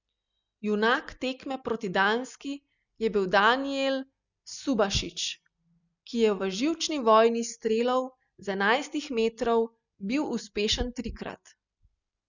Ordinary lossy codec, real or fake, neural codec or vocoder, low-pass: none; real; none; 7.2 kHz